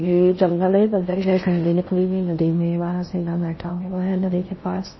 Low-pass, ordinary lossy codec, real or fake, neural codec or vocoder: 7.2 kHz; MP3, 24 kbps; fake; codec, 16 kHz in and 24 kHz out, 0.6 kbps, FocalCodec, streaming, 4096 codes